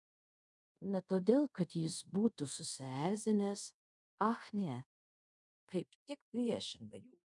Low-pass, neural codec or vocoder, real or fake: 10.8 kHz; codec, 24 kHz, 0.5 kbps, DualCodec; fake